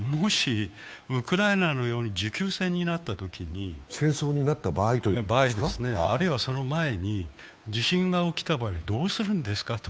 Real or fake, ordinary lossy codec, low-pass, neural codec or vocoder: fake; none; none; codec, 16 kHz, 2 kbps, FunCodec, trained on Chinese and English, 25 frames a second